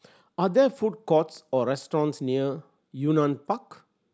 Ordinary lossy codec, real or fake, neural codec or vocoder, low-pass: none; real; none; none